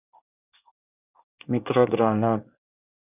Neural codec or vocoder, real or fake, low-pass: codec, 24 kHz, 1 kbps, SNAC; fake; 3.6 kHz